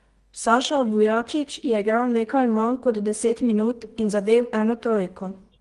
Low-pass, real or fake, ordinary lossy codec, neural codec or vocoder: 10.8 kHz; fake; Opus, 24 kbps; codec, 24 kHz, 0.9 kbps, WavTokenizer, medium music audio release